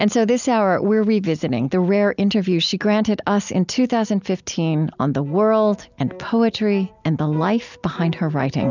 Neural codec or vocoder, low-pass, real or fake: none; 7.2 kHz; real